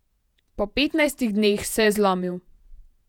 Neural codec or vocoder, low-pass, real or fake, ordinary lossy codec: vocoder, 48 kHz, 128 mel bands, Vocos; 19.8 kHz; fake; none